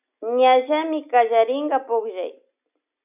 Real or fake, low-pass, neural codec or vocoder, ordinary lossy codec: real; 3.6 kHz; none; AAC, 32 kbps